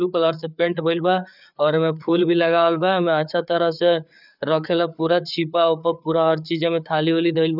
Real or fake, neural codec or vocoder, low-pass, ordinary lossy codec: fake; codec, 16 kHz, 4 kbps, FreqCodec, larger model; 5.4 kHz; none